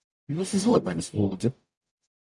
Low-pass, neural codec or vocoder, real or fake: 10.8 kHz; codec, 44.1 kHz, 0.9 kbps, DAC; fake